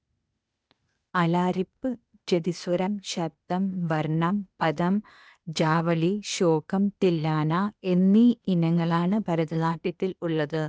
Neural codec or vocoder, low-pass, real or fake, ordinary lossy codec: codec, 16 kHz, 0.8 kbps, ZipCodec; none; fake; none